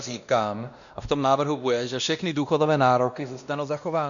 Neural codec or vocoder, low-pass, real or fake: codec, 16 kHz, 1 kbps, X-Codec, WavLM features, trained on Multilingual LibriSpeech; 7.2 kHz; fake